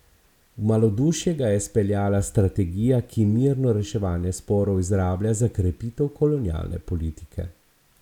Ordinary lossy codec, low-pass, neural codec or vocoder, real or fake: none; 19.8 kHz; none; real